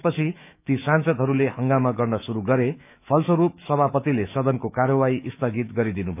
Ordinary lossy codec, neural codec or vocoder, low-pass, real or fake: none; autoencoder, 48 kHz, 128 numbers a frame, DAC-VAE, trained on Japanese speech; 3.6 kHz; fake